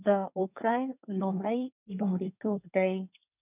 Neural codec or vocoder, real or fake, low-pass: codec, 24 kHz, 1 kbps, SNAC; fake; 3.6 kHz